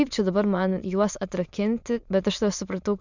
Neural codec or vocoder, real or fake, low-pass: autoencoder, 22.05 kHz, a latent of 192 numbers a frame, VITS, trained on many speakers; fake; 7.2 kHz